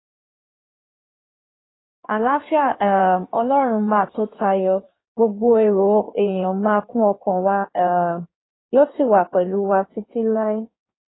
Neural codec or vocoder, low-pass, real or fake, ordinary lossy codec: codec, 16 kHz in and 24 kHz out, 1.1 kbps, FireRedTTS-2 codec; 7.2 kHz; fake; AAC, 16 kbps